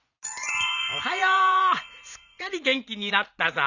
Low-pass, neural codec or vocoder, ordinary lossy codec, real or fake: 7.2 kHz; vocoder, 44.1 kHz, 80 mel bands, Vocos; none; fake